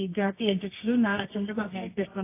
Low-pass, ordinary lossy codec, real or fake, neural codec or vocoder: 3.6 kHz; AAC, 24 kbps; fake; codec, 24 kHz, 0.9 kbps, WavTokenizer, medium music audio release